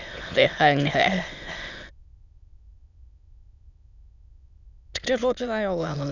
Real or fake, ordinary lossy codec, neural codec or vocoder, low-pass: fake; none; autoencoder, 22.05 kHz, a latent of 192 numbers a frame, VITS, trained on many speakers; 7.2 kHz